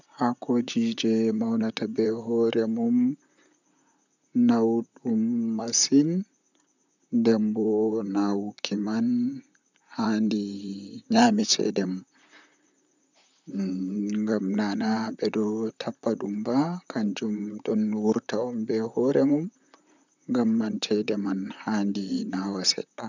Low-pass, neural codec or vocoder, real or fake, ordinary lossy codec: 7.2 kHz; vocoder, 44.1 kHz, 128 mel bands, Pupu-Vocoder; fake; none